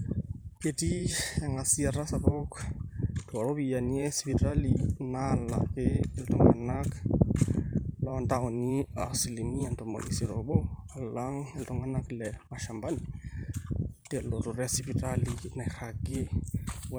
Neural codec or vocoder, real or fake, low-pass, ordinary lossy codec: vocoder, 44.1 kHz, 128 mel bands every 512 samples, BigVGAN v2; fake; none; none